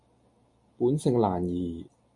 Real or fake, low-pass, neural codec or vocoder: real; 10.8 kHz; none